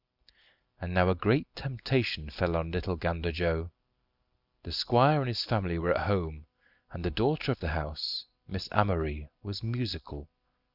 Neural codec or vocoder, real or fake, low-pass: none; real; 5.4 kHz